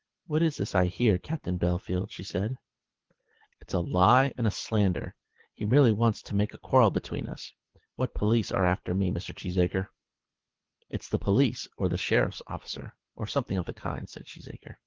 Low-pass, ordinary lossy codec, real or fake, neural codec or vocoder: 7.2 kHz; Opus, 16 kbps; fake; codec, 24 kHz, 6 kbps, HILCodec